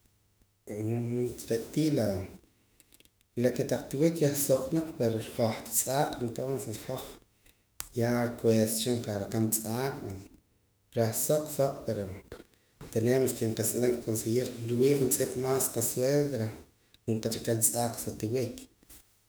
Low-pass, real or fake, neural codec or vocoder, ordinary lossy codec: none; fake; autoencoder, 48 kHz, 32 numbers a frame, DAC-VAE, trained on Japanese speech; none